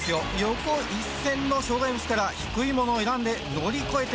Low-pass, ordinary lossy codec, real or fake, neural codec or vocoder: none; none; real; none